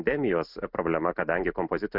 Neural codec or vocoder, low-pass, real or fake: none; 5.4 kHz; real